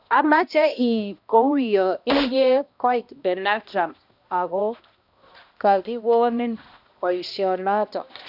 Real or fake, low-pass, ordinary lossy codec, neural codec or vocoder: fake; 5.4 kHz; none; codec, 16 kHz, 1 kbps, X-Codec, HuBERT features, trained on balanced general audio